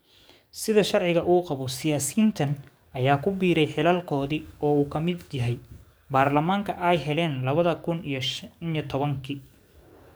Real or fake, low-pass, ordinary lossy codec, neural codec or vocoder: fake; none; none; codec, 44.1 kHz, 7.8 kbps, DAC